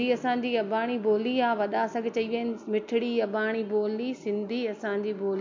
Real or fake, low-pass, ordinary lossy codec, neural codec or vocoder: real; 7.2 kHz; MP3, 48 kbps; none